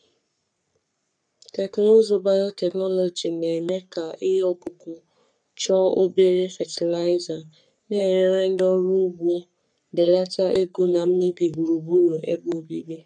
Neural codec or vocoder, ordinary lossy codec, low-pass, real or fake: codec, 44.1 kHz, 3.4 kbps, Pupu-Codec; none; 9.9 kHz; fake